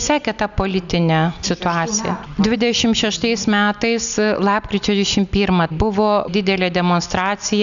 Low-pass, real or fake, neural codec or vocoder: 7.2 kHz; real; none